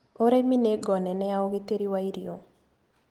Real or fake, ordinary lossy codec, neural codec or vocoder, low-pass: real; Opus, 24 kbps; none; 19.8 kHz